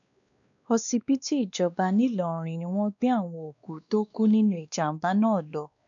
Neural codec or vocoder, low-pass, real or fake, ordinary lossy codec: codec, 16 kHz, 2 kbps, X-Codec, WavLM features, trained on Multilingual LibriSpeech; 7.2 kHz; fake; none